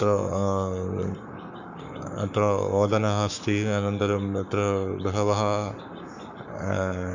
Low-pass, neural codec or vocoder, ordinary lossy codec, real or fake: 7.2 kHz; codec, 44.1 kHz, 7.8 kbps, Pupu-Codec; none; fake